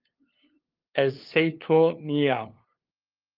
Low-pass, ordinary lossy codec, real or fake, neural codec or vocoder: 5.4 kHz; Opus, 24 kbps; fake; codec, 16 kHz, 2 kbps, FunCodec, trained on LibriTTS, 25 frames a second